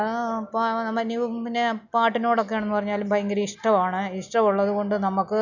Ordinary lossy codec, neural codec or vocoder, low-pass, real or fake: none; none; 7.2 kHz; real